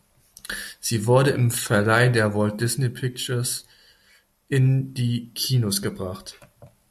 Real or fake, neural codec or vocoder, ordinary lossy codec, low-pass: real; none; AAC, 96 kbps; 14.4 kHz